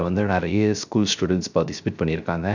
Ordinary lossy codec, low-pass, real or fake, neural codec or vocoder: none; 7.2 kHz; fake; codec, 16 kHz, 0.3 kbps, FocalCodec